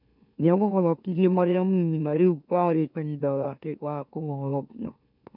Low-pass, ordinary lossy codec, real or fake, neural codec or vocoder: 5.4 kHz; none; fake; autoencoder, 44.1 kHz, a latent of 192 numbers a frame, MeloTTS